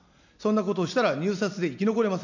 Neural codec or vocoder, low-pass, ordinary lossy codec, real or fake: none; 7.2 kHz; none; real